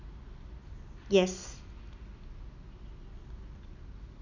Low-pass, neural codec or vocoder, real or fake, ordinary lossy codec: 7.2 kHz; none; real; none